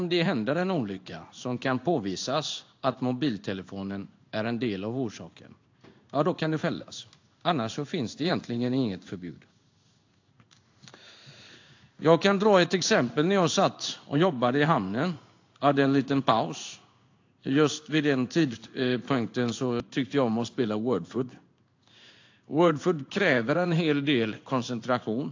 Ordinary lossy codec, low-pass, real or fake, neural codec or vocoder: AAC, 48 kbps; 7.2 kHz; fake; codec, 16 kHz in and 24 kHz out, 1 kbps, XY-Tokenizer